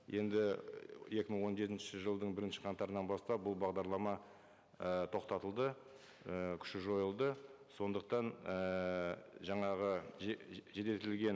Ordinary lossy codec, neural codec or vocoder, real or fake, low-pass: none; none; real; none